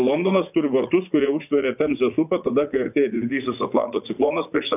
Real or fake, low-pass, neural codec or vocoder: fake; 3.6 kHz; vocoder, 44.1 kHz, 80 mel bands, Vocos